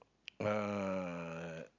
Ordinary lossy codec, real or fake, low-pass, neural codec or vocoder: none; real; 7.2 kHz; none